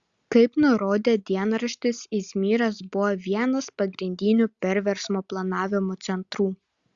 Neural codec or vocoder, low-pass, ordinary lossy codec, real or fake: none; 7.2 kHz; Opus, 64 kbps; real